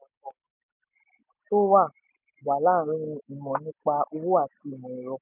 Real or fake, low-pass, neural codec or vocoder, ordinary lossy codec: real; 3.6 kHz; none; none